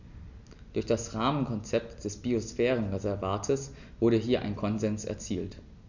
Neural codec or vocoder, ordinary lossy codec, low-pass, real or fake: none; none; 7.2 kHz; real